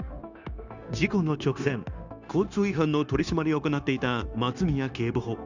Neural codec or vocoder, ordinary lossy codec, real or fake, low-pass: codec, 16 kHz, 0.9 kbps, LongCat-Audio-Codec; none; fake; 7.2 kHz